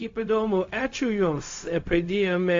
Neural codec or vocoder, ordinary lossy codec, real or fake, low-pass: codec, 16 kHz, 0.4 kbps, LongCat-Audio-Codec; AAC, 48 kbps; fake; 7.2 kHz